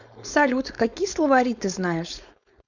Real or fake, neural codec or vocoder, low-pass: fake; codec, 16 kHz, 4.8 kbps, FACodec; 7.2 kHz